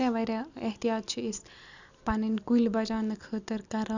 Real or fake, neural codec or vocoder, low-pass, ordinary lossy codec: real; none; 7.2 kHz; none